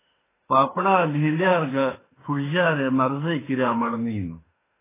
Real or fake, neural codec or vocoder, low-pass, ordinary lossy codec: fake; autoencoder, 48 kHz, 32 numbers a frame, DAC-VAE, trained on Japanese speech; 3.6 kHz; AAC, 16 kbps